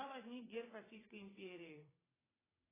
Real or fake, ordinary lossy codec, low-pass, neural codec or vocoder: fake; AAC, 16 kbps; 3.6 kHz; vocoder, 22.05 kHz, 80 mel bands, WaveNeXt